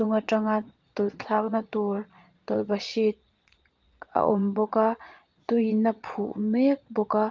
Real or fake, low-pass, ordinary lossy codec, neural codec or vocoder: fake; 7.2 kHz; Opus, 32 kbps; vocoder, 22.05 kHz, 80 mel bands, Vocos